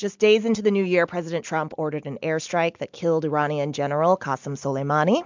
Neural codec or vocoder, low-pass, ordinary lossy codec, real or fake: none; 7.2 kHz; MP3, 64 kbps; real